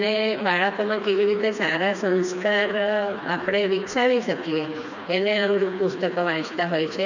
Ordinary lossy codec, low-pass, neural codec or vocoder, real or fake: none; 7.2 kHz; codec, 16 kHz, 2 kbps, FreqCodec, smaller model; fake